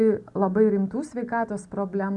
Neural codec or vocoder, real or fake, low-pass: none; real; 10.8 kHz